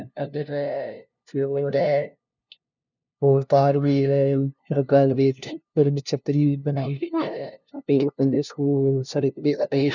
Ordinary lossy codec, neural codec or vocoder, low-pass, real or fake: none; codec, 16 kHz, 0.5 kbps, FunCodec, trained on LibriTTS, 25 frames a second; 7.2 kHz; fake